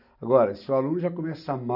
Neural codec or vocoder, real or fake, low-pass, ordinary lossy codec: none; real; 5.4 kHz; none